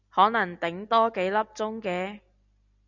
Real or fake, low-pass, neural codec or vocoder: real; 7.2 kHz; none